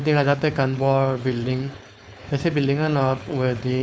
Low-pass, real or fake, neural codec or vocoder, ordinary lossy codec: none; fake; codec, 16 kHz, 4.8 kbps, FACodec; none